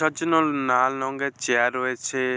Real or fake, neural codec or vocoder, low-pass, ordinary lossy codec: real; none; none; none